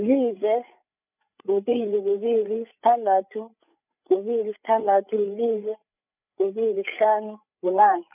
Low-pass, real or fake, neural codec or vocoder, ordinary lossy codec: 3.6 kHz; fake; codec, 16 kHz, 8 kbps, FreqCodec, larger model; none